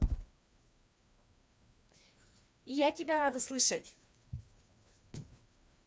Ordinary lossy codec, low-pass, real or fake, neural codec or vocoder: none; none; fake; codec, 16 kHz, 2 kbps, FreqCodec, larger model